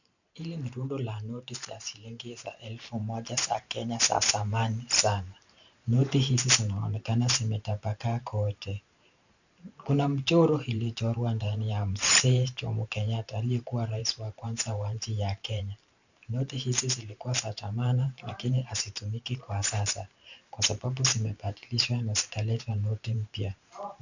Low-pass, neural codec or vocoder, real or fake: 7.2 kHz; vocoder, 44.1 kHz, 128 mel bands every 512 samples, BigVGAN v2; fake